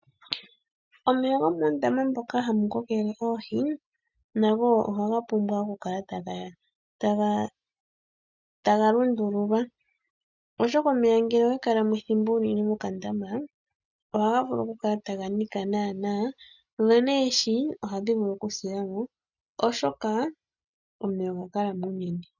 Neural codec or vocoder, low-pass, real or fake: none; 7.2 kHz; real